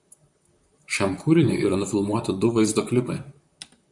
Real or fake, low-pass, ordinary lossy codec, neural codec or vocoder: fake; 10.8 kHz; MP3, 96 kbps; vocoder, 44.1 kHz, 128 mel bands, Pupu-Vocoder